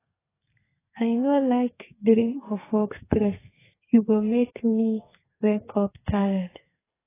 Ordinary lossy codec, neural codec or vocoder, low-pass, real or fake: AAC, 16 kbps; codec, 32 kHz, 1.9 kbps, SNAC; 3.6 kHz; fake